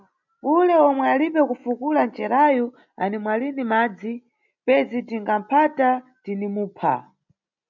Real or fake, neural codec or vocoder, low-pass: real; none; 7.2 kHz